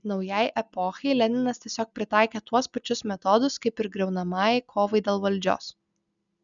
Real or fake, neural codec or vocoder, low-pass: real; none; 7.2 kHz